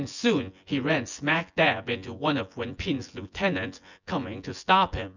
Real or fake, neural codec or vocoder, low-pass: fake; vocoder, 24 kHz, 100 mel bands, Vocos; 7.2 kHz